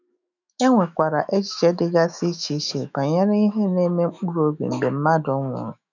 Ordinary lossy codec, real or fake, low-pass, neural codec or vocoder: none; fake; 7.2 kHz; autoencoder, 48 kHz, 128 numbers a frame, DAC-VAE, trained on Japanese speech